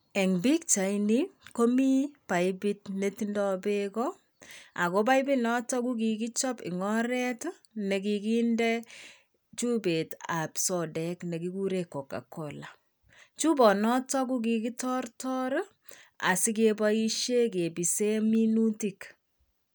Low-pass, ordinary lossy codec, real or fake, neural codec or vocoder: none; none; real; none